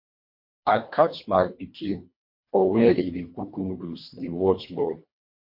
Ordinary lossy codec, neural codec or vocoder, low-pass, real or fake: MP3, 32 kbps; codec, 24 kHz, 1.5 kbps, HILCodec; 5.4 kHz; fake